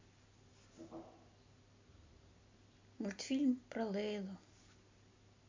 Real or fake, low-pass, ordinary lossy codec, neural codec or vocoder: real; 7.2 kHz; MP3, 64 kbps; none